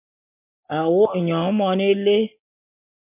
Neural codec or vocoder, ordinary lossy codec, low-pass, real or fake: none; MP3, 24 kbps; 3.6 kHz; real